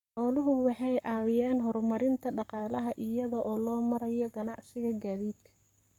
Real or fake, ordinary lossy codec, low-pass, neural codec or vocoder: fake; none; 19.8 kHz; codec, 44.1 kHz, 7.8 kbps, Pupu-Codec